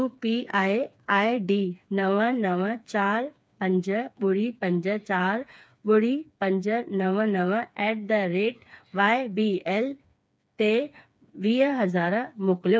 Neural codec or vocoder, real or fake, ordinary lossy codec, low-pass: codec, 16 kHz, 4 kbps, FreqCodec, smaller model; fake; none; none